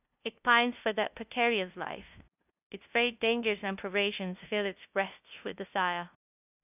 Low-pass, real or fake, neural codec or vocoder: 3.6 kHz; fake; codec, 16 kHz, 0.5 kbps, FunCodec, trained on LibriTTS, 25 frames a second